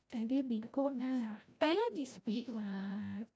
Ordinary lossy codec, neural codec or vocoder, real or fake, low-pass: none; codec, 16 kHz, 0.5 kbps, FreqCodec, larger model; fake; none